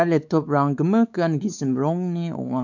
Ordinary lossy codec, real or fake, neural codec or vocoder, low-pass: none; fake; codec, 16 kHz, 4 kbps, X-Codec, WavLM features, trained on Multilingual LibriSpeech; 7.2 kHz